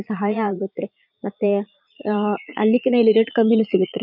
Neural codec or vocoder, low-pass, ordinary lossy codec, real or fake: vocoder, 22.05 kHz, 80 mel bands, Vocos; 5.4 kHz; none; fake